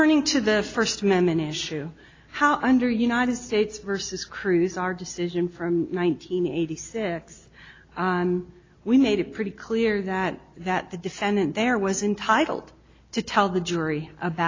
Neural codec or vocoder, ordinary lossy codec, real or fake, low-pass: none; AAC, 32 kbps; real; 7.2 kHz